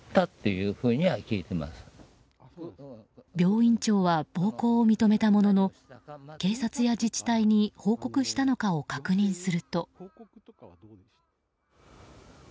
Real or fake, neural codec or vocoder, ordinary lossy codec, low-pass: real; none; none; none